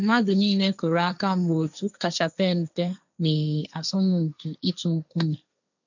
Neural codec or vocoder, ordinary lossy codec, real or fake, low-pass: codec, 16 kHz, 1.1 kbps, Voila-Tokenizer; none; fake; 7.2 kHz